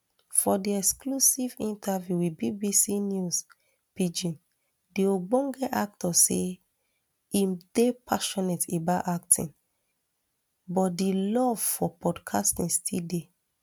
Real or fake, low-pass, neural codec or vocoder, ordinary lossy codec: real; none; none; none